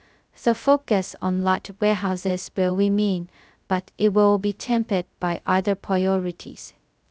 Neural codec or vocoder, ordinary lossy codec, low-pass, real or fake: codec, 16 kHz, 0.2 kbps, FocalCodec; none; none; fake